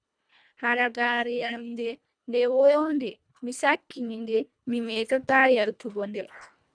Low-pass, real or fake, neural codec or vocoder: 9.9 kHz; fake; codec, 24 kHz, 1.5 kbps, HILCodec